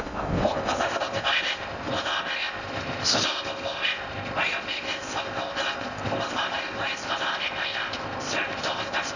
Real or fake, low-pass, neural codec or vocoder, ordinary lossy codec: fake; 7.2 kHz; codec, 16 kHz in and 24 kHz out, 0.6 kbps, FocalCodec, streaming, 4096 codes; none